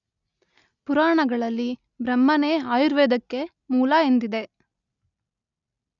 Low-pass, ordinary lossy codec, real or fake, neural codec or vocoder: 7.2 kHz; none; real; none